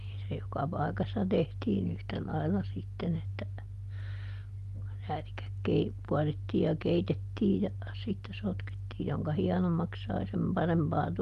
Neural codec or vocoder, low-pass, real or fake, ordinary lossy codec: none; 14.4 kHz; real; Opus, 32 kbps